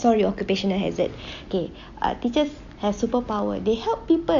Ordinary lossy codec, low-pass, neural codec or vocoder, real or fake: none; 7.2 kHz; none; real